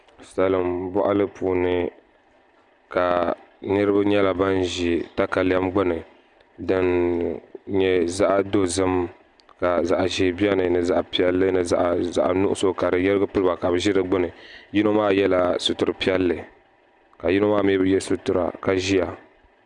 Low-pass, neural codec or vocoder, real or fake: 10.8 kHz; none; real